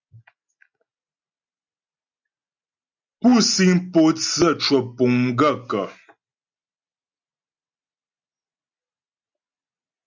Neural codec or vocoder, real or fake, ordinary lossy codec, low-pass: none; real; MP3, 64 kbps; 7.2 kHz